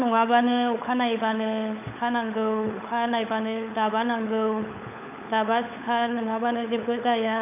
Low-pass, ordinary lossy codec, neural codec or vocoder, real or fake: 3.6 kHz; none; codec, 16 kHz, 16 kbps, FunCodec, trained on LibriTTS, 50 frames a second; fake